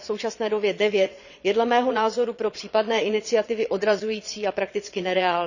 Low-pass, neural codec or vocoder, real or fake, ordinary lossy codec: 7.2 kHz; vocoder, 22.05 kHz, 80 mel bands, Vocos; fake; none